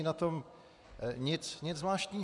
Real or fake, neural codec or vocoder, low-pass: fake; vocoder, 24 kHz, 100 mel bands, Vocos; 10.8 kHz